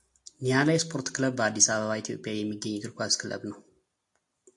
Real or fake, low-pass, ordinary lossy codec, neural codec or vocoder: real; 10.8 kHz; AAC, 64 kbps; none